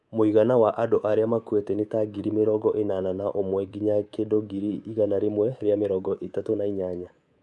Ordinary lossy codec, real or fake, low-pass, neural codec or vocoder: none; fake; none; codec, 24 kHz, 3.1 kbps, DualCodec